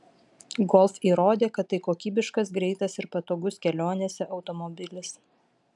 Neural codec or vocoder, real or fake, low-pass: none; real; 10.8 kHz